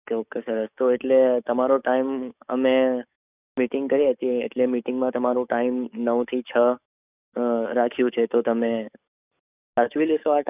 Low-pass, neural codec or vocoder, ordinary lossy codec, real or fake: 3.6 kHz; codec, 44.1 kHz, 7.8 kbps, DAC; none; fake